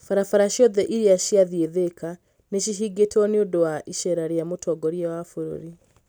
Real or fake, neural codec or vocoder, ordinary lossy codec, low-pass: real; none; none; none